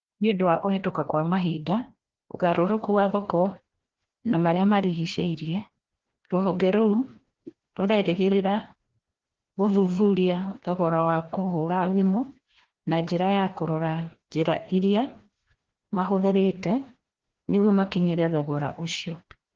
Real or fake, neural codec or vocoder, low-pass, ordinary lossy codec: fake; codec, 16 kHz, 1 kbps, FreqCodec, larger model; 7.2 kHz; Opus, 16 kbps